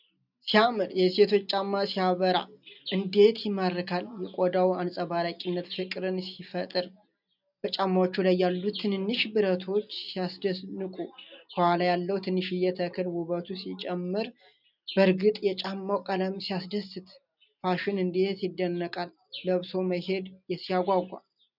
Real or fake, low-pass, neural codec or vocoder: real; 5.4 kHz; none